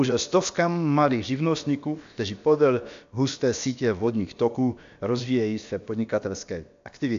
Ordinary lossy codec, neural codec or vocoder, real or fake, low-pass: AAC, 64 kbps; codec, 16 kHz, about 1 kbps, DyCAST, with the encoder's durations; fake; 7.2 kHz